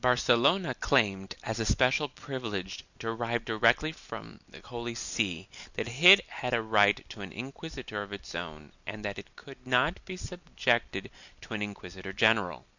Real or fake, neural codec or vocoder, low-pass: real; none; 7.2 kHz